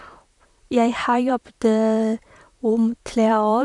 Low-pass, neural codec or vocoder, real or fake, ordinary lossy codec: 10.8 kHz; vocoder, 44.1 kHz, 128 mel bands, Pupu-Vocoder; fake; none